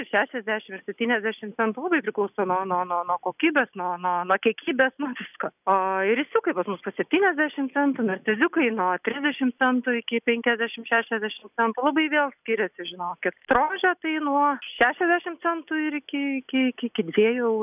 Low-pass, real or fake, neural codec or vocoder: 3.6 kHz; real; none